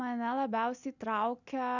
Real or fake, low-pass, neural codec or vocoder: real; 7.2 kHz; none